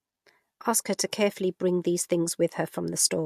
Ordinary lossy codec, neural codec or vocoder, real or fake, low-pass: MP3, 64 kbps; none; real; 14.4 kHz